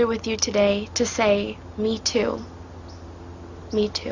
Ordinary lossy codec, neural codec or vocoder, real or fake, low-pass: Opus, 64 kbps; none; real; 7.2 kHz